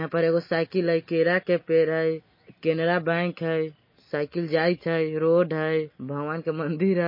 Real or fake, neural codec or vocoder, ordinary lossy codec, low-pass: real; none; MP3, 24 kbps; 5.4 kHz